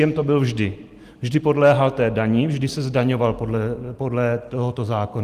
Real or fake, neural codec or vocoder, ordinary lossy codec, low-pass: real; none; Opus, 24 kbps; 14.4 kHz